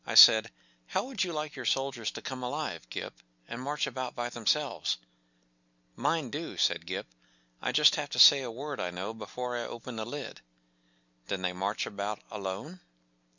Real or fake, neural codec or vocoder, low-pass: real; none; 7.2 kHz